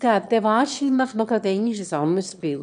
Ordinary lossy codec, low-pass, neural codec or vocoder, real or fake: none; 9.9 kHz; autoencoder, 22.05 kHz, a latent of 192 numbers a frame, VITS, trained on one speaker; fake